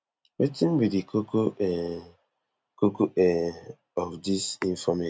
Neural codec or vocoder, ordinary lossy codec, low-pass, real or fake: none; none; none; real